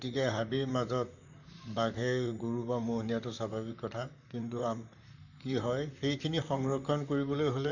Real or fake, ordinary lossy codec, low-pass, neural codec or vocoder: fake; none; 7.2 kHz; vocoder, 44.1 kHz, 128 mel bands, Pupu-Vocoder